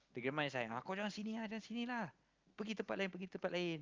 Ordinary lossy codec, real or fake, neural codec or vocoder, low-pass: Opus, 32 kbps; real; none; 7.2 kHz